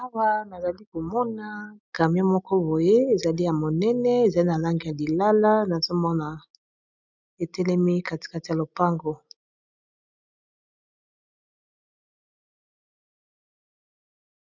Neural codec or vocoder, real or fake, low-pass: none; real; 7.2 kHz